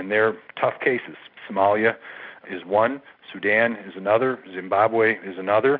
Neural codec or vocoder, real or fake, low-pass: none; real; 5.4 kHz